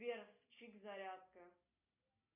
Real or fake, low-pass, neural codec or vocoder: real; 3.6 kHz; none